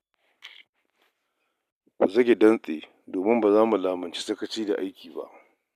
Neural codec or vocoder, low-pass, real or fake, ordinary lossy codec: none; 14.4 kHz; real; none